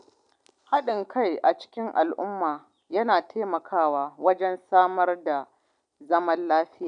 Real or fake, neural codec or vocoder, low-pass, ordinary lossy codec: real; none; 9.9 kHz; none